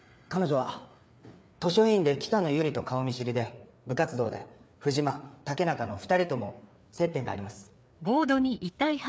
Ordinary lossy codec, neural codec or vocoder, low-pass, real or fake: none; codec, 16 kHz, 4 kbps, FreqCodec, larger model; none; fake